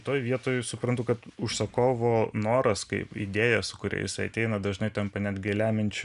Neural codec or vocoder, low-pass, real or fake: none; 10.8 kHz; real